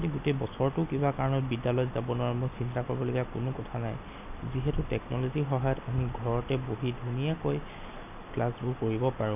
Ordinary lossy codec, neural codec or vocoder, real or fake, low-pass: none; none; real; 3.6 kHz